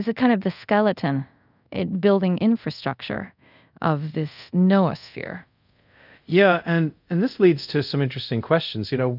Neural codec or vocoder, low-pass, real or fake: codec, 24 kHz, 0.5 kbps, DualCodec; 5.4 kHz; fake